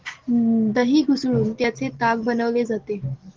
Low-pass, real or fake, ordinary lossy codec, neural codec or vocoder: 7.2 kHz; real; Opus, 16 kbps; none